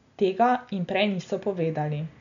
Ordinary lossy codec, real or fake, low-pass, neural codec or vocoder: none; real; 7.2 kHz; none